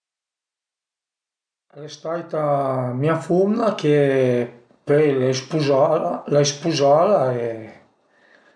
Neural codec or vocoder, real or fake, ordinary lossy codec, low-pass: none; real; none; 9.9 kHz